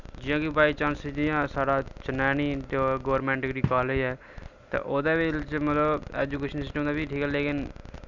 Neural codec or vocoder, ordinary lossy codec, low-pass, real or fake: none; none; 7.2 kHz; real